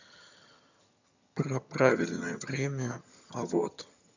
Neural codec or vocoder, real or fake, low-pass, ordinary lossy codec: vocoder, 22.05 kHz, 80 mel bands, HiFi-GAN; fake; 7.2 kHz; none